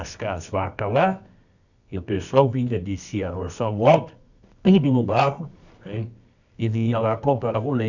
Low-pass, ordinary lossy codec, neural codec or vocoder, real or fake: 7.2 kHz; none; codec, 24 kHz, 0.9 kbps, WavTokenizer, medium music audio release; fake